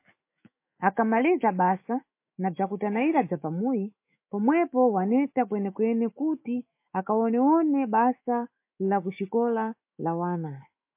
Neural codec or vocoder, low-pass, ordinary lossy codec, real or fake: autoencoder, 48 kHz, 128 numbers a frame, DAC-VAE, trained on Japanese speech; 3.6 kHz; MP3, 24 kbps; fake